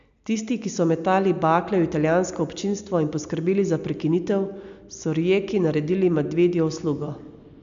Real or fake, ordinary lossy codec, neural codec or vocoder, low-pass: real; MP3, 64 kbps; none; 7.2 kHz